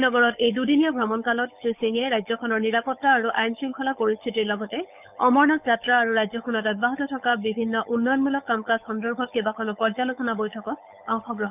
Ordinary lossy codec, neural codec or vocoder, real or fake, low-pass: none; codec, 16 kHz, 8 kbps, FunCodec, trained on Chinese and English, 25 frames a second; fake; 3.6 kHz